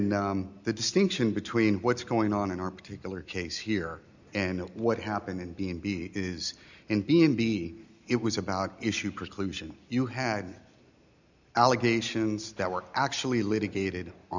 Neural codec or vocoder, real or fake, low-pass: none; real; 7.2 kHz